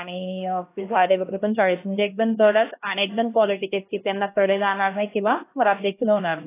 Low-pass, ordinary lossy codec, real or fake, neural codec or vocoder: 3.6 kHz; AAC, 24 kbps; fake; codec, 16 kHz, 1 kbps, X-Codec, HuBERT features, trained on LibriSpeech